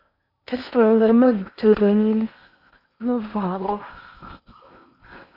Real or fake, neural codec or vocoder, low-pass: fake; codec, 16 kHz in and 24 kHz out, 0.8 kbps, FocalCodec, streaming, 65536 codes; 5.4 kHz